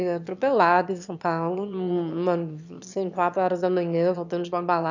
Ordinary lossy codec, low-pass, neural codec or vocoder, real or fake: none; 7.2 kHz; autoencoder, 22.05 kHz, a latent of 192 numbers a frame, VITS, trained on one speaker; fake